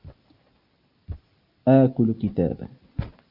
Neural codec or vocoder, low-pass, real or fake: none; 5.4 kHz; real